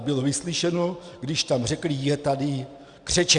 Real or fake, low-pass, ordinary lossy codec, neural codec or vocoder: real; 9.9 kHz; Opus, 64 kbps; none